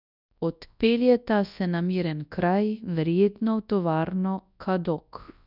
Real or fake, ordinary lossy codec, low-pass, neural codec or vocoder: fake; none; 5.4 kHz; codec, 24 kHz, 0.9 kbps, WavTokenizer, large speech release